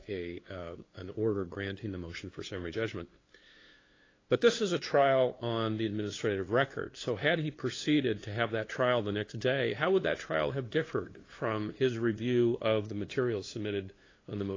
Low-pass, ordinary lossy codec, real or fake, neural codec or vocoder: 7.2 kHz; AAC, 32 kbps; fake; codec, 16 kHz, 2 kbps, FunCodec, trained on LibriTTS, 25 frames a second